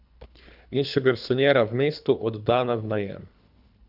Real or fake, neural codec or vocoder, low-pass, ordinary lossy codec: fake; codec, 24 kHz, 3 kbps, HILCodec; 5.4 kHz; none